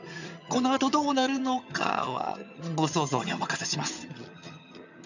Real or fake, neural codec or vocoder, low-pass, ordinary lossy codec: fake; vocoder, 22.05 kHz, 80 mel bands, HiFi-GAN; 7.2 kHz; none